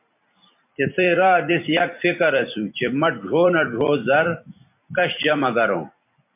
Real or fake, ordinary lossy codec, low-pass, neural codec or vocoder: fake; MP3, 32 kbps; 3.6 kHz; vocoder, 44.1 kHz, 128 mel bands every 256 samples, BigVGAN v2